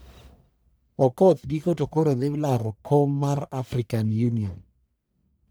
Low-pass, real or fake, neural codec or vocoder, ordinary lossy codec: none; fake; codec, 44.1 kHz, 1.7 kbps, Pupu-Codec; none